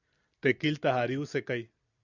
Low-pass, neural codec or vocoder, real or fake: 7.2 kHz; none; real